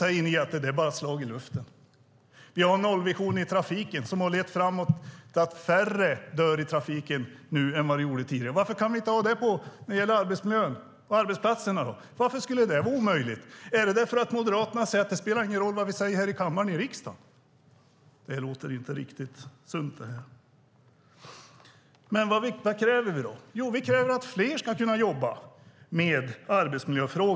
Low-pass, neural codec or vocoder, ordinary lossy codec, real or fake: none; none; none; real